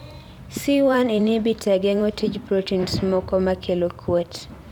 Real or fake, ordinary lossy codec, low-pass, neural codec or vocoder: fake; none; 19.8 kHz; vocoder, 44.1 kHz, 128 mel bands every 512 samples, BigVGAN v2